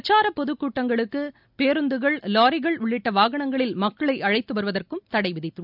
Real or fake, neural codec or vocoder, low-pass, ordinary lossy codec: real; none; 5.4 kHz; none